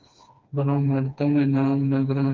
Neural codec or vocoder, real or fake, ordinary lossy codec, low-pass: codec, 16 kHz, 2 kbps, FreqCodec, smaller model; fake; Opus, 24 kbps; 7.2 kHz